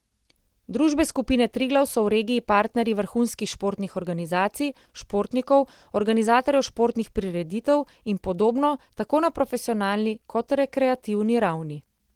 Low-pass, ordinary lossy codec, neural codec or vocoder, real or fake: 19.8 kHz; Opus, 16 kbps; none; real